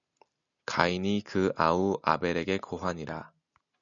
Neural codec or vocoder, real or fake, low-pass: none; real; 7.2 kHz